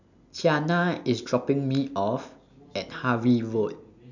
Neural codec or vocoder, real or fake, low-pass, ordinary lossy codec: none; real; 7.2 kHz; none